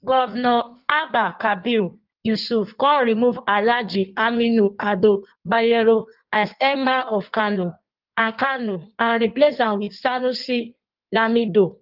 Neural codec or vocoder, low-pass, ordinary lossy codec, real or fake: codec, 16 kHz in and 24 kHz out, 1.1 kbps, FireRedTTS-2 codec; 5.4 kHz; Opus, 32 kbps; fake